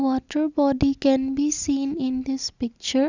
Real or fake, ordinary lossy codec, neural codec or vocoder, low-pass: real; Opus, 64 kbps; none; 7.2 kHz